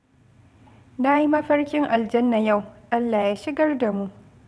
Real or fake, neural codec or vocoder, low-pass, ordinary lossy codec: fake; vocoder, 22.05 kHz, 80 mel bands, WaveNeXt; none; none